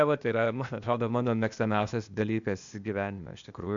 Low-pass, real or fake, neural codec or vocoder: 7.2 kHz; fake; codec, 16 kHz, 0.8 kbps, ZipCodec